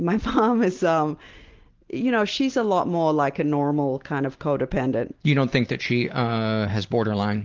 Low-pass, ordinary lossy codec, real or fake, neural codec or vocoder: 7.2 kHz; Opus, 16 kbps; real; none